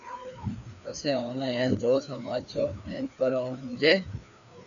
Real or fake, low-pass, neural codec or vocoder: fake; 7.2 kHz; codec, 16 kHz, 2 kbps, FreqCodec, larger model